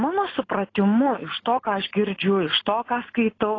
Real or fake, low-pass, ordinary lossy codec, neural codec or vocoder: real; 7.2 kHz; AAC, 32 kbps; none